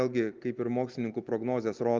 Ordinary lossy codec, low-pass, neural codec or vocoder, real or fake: Opus, 24 kbps; 7.2 kHz; none; real